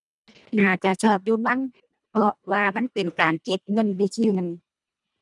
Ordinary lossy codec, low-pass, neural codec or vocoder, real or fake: none; 10.8 kHz; codec, 24 kHz, 1.5 kbps, HILCodec; fake